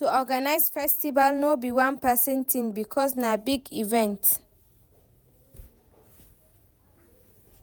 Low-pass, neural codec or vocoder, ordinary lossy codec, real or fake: none; vocoder, 48 kHz, 128 mel bands, Vocos; none; fake